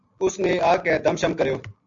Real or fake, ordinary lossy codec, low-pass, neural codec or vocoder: real; AAC, 64 kbps; 7.2 kHz; none